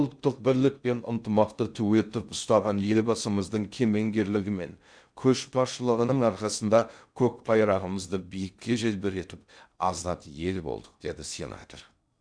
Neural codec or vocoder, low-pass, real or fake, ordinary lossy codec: codec, 16 kHz in and 24 kHz out, 0.6 kbps, FocalCodec, streaming, 2048 codes; 9.9 kHz; fake; none